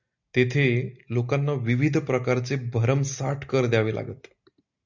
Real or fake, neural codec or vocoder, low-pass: real; none; 7.2 kHz